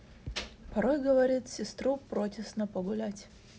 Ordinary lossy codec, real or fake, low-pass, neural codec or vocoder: none; real; none; none